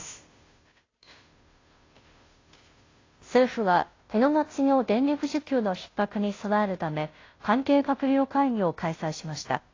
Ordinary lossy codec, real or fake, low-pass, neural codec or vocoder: AAC, 32 kbps; fake; 7.2 kHz; codec, 16 kHz, 0.5 kbps, FunCodec, trained on Chinese and English, 25 frames a second